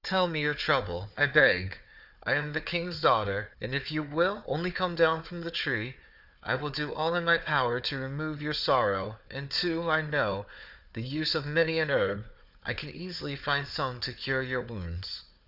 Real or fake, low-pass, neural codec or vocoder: fake; 5.4 kHz; codec, 16 kHz, 4 kbps, FunCodec, trained on Chinese and English, 50 frames a second